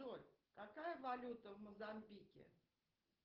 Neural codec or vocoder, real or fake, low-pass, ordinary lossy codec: none; real; 5.4 kHz; Opus, 16 kbps